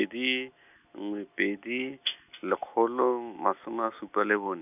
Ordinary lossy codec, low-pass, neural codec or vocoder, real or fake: none; 3.6 kHz; none; real